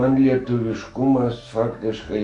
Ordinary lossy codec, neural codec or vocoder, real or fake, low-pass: MP3, 96 kbps; autoencoder, 48 kHz, 128 numbers a frame, DAC-VAE, trained on Japanese speech; fake; 10.8 kHz